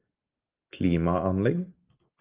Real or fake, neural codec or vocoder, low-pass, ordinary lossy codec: real; none; 3.6 kHz; Opus, 32 kbps